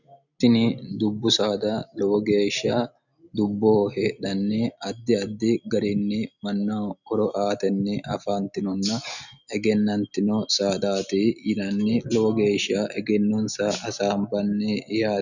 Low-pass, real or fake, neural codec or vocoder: 7.2 kHz; real; none